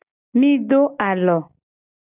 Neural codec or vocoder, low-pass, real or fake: none; 3.6 kHz; real